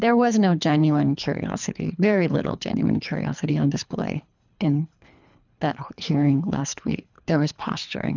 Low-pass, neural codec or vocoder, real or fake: 7.2 kHz; codec, 24 kHz, 3 kbps, HILCodec; fake